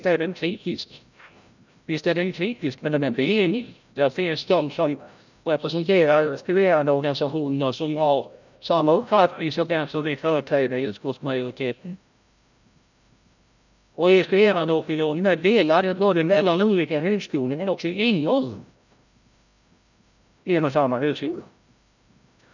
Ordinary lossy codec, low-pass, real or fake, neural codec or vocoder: none; 7.2 kHz; fake; codec, 16 kHz, 0.5 kbps, FreqCodec, larger model